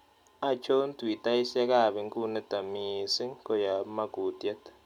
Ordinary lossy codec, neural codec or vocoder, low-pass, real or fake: none; none; 19.8 kHz; real